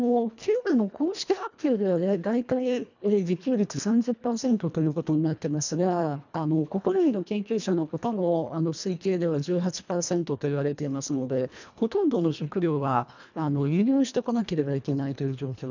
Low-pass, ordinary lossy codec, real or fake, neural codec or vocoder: 7.2 kHz; none; fake; codec, 24 kHz, 1.5 kbps, HILCodec